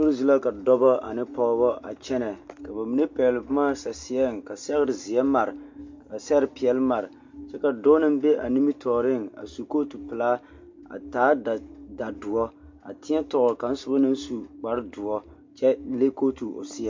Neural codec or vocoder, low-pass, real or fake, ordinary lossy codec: none; 7.2 kHz; real; MP3, 48 kbps